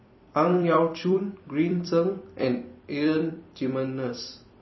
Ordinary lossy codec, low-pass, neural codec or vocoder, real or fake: MP3, 24 kbps; 7.2 kHz; vocoder, 44.1 kHz, 128 mel bands every 256 samples, BigVGAN v2; fake